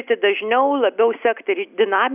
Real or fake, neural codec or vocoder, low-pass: real; none; 3.6 kHz